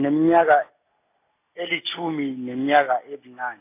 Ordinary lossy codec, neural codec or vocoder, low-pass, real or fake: AAC, 24 kbps; none; 3.6 kHz; real